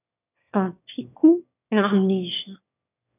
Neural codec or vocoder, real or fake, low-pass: autoencoder, 22.05 kHz, a latent of 192 numbers a frame, VITS, trained on one speaker; fake; 3.6 kHz